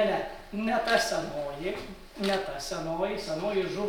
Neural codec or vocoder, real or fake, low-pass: none; real; 19.8 kHz